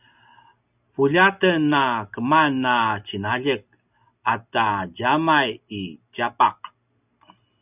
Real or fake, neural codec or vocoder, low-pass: real; none; 3.6 kHz